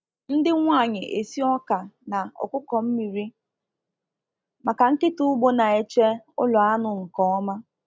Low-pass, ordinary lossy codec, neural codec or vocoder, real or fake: none; none; none; real